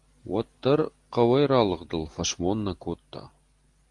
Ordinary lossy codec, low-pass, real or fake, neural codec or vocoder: Opus, 24 kbps; 10.8 kHz; real; none